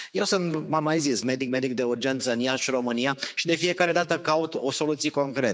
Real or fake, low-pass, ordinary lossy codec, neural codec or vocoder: fake; none; none; codec, 16 kHz, 4 kbps, X-Codec, HuBERT features, trained on general audio